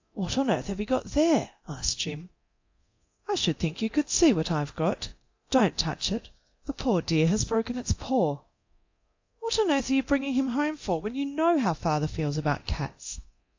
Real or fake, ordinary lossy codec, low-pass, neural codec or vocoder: fake; AAC, 48 kbps; 7.2 kHz; codec, 24 kHz, 0.9 kbps, DualCodec